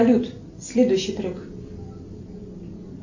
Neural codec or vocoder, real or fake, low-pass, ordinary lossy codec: none; real; 7.2 kHz; AAC, 48 kbps